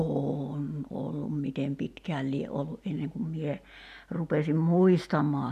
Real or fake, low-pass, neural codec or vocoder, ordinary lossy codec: real; 14.4 kHz; none; none